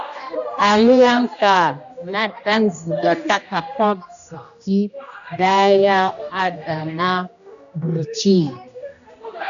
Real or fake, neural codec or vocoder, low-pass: fake; codec, 16 kHz, 1 kbps, X-Codec, HuBERT features, trained on general audio; 7.2 kHz